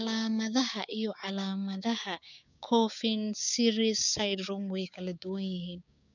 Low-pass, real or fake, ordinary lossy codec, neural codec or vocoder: 7.2 kHz; fake; none; codec, 16 kHz, 6 kbps, DAC